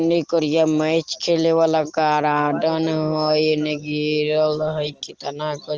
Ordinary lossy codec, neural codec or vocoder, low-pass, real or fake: Opus, 16 kbps; none; 7.2 kHz; real